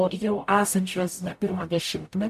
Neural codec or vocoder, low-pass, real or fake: codec, 44.1 kHz, 0.9 kbps, DAC; 14.4 kHz; fake